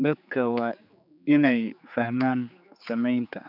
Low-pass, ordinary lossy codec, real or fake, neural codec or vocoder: 5.4 kHz; none; fake; codec, 16 kHz, 4 kbps, X-Codec, HuBERT features, trained on general audio